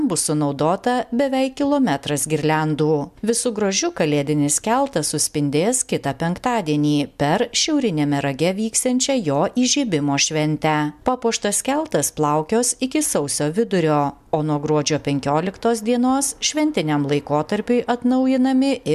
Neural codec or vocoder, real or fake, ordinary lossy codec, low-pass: none; real; MP3, 96 kbps; 14.4 kHz